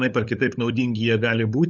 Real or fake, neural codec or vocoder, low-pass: fake; codec, 16 kHz, 8 kbps, FunCodec, trained on LibriTTS, 25 frames a second; 7.2 kHz